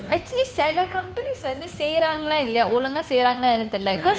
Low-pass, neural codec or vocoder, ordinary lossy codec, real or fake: none; codec, 16 kHz, 2 kbps, FunCodec, trained on Chinese and English, 25 frames a second; none; fake